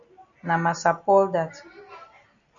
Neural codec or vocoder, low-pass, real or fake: none; 7.2 kHz; real